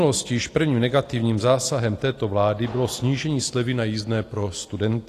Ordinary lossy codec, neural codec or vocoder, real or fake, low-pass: AAC, 48 kbps; none; real; 14.4 kHz